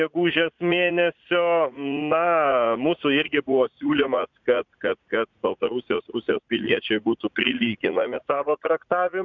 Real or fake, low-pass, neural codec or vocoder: fake; 7.2 kHz; vocoder, 44.1 kHz, 80 mel bands, Vocos